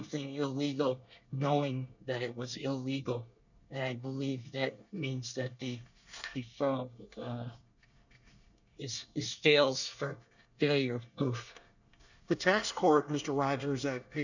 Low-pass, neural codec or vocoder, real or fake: 7.2 kHz; codec, 24 kHz, 1 kbps, SNAC; fake